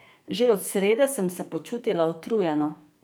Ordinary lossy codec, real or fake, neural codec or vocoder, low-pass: none; fake; codec, 44.1 kHz, 2.6 kbps, SNAC; none